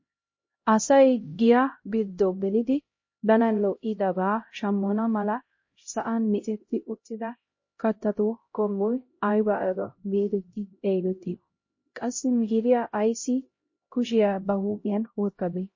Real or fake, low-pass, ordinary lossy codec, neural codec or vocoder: fake; 7.2 kHz; MP3, 32 kbps; codec, 16 kHz, 0.5 kbps, X-Codec, HuBERT features, trained on LibriSpeech